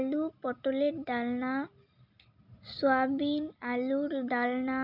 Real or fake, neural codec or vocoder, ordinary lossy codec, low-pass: real; none; none; 5.4 kHz